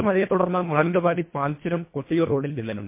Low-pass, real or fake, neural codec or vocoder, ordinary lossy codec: 3.6 kHz; fake; codec, 24 kHz, 1.5 kbps, HILCodec; MP3, 24 kbps